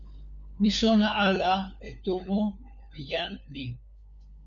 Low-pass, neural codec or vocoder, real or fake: 7.2 kHz; codec, 16 kHz, 4 kbps, FunCodec, trained on LibriTTS, 50 frames a second; fake